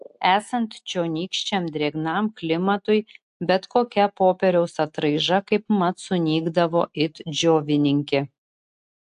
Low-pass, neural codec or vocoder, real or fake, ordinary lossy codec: 10.8 kHz; none; real; AAC, 64 kbps